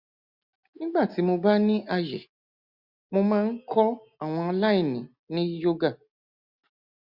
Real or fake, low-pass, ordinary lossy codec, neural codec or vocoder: real; 5.4 kHz; Opus, 64 kbps; none